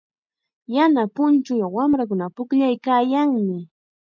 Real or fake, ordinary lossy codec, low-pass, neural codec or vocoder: real; MP3, 64 kbps; 7.2 kHz; none